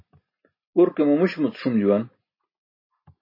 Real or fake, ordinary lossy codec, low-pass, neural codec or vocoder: real; MP3, 24 kbps; 5.4 kHz; none